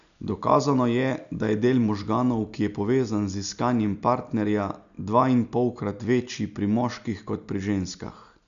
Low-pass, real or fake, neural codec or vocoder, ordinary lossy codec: 7.2 kHz; real; none; none